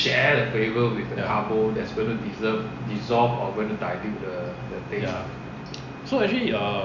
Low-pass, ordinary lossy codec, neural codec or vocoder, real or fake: 7.2 kHz; none; none; real